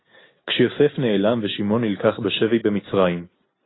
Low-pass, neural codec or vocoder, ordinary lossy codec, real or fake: 7.2 kHz; none; AAC, 16 kbps; real